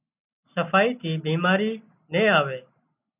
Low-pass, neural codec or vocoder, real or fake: 3.6 kHz; none; real